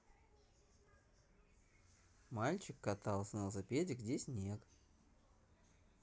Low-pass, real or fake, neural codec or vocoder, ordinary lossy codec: none; real; none; none